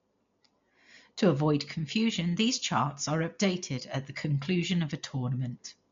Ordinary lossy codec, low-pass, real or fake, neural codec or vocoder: MP3, 48 kbps; 7.2 kHz; real; none